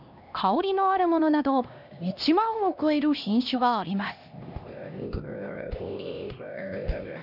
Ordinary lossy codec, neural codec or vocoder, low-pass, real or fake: none; codec, 16 kHz, 1 kbps, X-Codec, HuBERT features, trained on LibriSpeech; 5.4 kHz; fake